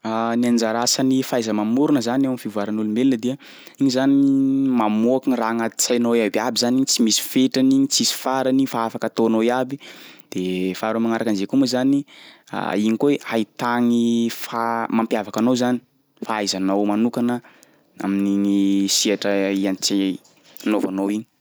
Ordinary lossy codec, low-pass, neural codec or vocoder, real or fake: none; none; none; real